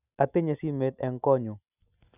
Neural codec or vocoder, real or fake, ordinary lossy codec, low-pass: none; real; none; 3.6 kHz